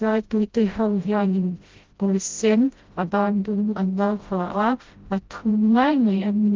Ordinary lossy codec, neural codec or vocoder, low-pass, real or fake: Opus, 32 kbps; codec, 16 kHz, 0.5 kbps, FreqCodec, smaller model; 7.2 kHz; fake